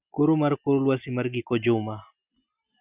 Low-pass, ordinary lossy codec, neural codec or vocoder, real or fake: 3.6 kHz; Opus, 64 kbps; none; real